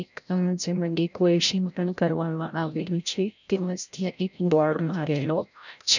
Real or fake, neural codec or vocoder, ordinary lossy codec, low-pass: fake; codec, 16 kHz, 0.5 kbps, FreqCodec, larger model; none; 7.2 kHz